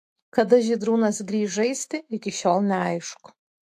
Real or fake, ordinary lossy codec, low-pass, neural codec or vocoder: fake; AAC, 64 kbps; 14.4 kHz; autoencoder, 48 kHz, 128 numbers a frame, DAC-VAE, trained on Japanese speech